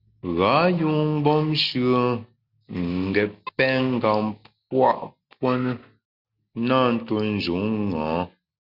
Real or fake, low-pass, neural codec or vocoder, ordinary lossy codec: real; 5.4 kHz; none; Opus, 64 kbps